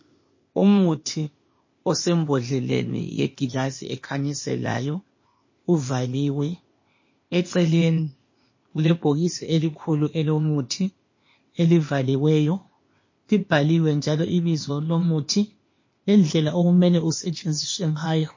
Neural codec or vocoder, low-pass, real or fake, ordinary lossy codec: codec, 16 kHz, 0.8 kbps, ZipCodec; 7.2 kHz; fake; MP3, 32 kbps